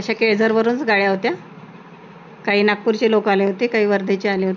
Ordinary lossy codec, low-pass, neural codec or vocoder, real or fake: none; 7.2 kHz; none; real